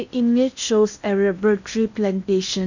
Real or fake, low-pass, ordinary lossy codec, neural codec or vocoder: fake; 7.2 kHz; none; codec, 16 kHz in and 24 kHz out, 0.8 kbps, FocalCodec, streaming, 65536 codes